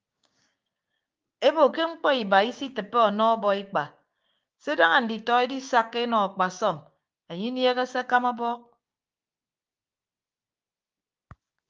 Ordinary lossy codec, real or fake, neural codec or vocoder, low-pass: Opus, 24 kbps; fake; codec, 16 kHz, 6 kbps, DAC; 7.2 kHz